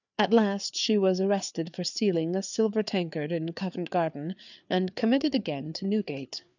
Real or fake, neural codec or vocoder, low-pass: fake; codec, 16 kHz, 4 kbps, FreqCodec, larger model; 7.2 kHz